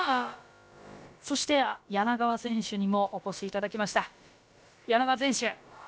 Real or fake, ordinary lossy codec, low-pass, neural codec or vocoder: fake; none; none; codec, 16 kHz, about 1 kbps, DyCAST, with the encoder's durations